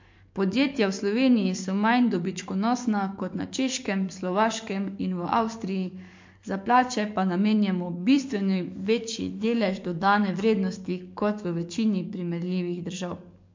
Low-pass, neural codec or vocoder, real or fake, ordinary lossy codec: 7.2 kHz; codec, 44.1 kHz, 7.8 kbps, DAC; fake; MP3, 48 kbps